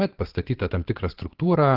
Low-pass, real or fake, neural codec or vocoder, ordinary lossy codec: 5.4 kHz; fake; vocoder, 22.05 kHz, 80 mel bands, Vocos; Opus, 16 kbps